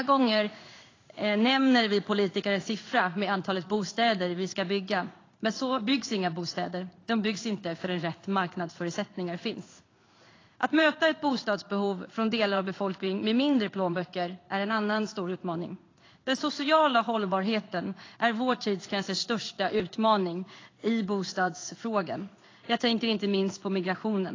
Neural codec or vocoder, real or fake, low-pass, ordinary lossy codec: codec, 16 kHz in and 24 kHz out, 1 kbps, XY-Tokenizer; fake; 7.2 kHz; AAC, 32 kbps